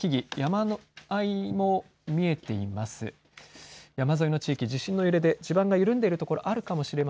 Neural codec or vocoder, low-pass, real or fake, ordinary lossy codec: none; none; real; none